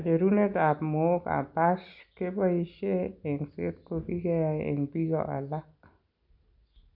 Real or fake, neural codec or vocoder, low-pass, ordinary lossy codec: real; none; 5.4 kHz; none